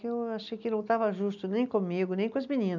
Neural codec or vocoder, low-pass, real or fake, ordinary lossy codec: none; 7.2 kHz; real; none